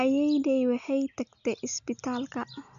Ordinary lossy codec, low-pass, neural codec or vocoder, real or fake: none; 7.2 kHz; none; real